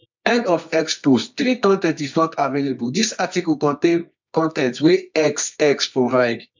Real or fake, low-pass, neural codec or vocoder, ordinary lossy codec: fake; 7.2 kHz; codec, 24 kHz, 0.9 kbps, WavTokenizer, medium music audio release; MP3, 64 kbps